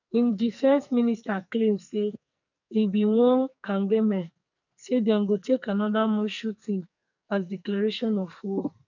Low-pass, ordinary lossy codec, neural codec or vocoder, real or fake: 7.2 kHz; none; codec, 44.1 kHz, 2.6 kbps, SNAC; fake